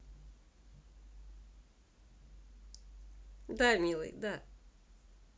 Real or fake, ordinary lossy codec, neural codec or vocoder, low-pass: real; none; none; none